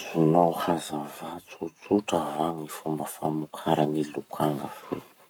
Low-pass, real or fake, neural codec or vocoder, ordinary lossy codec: none; fake; codec, 44.1 kHz, 7.8 kbps, Pupu-Codec; none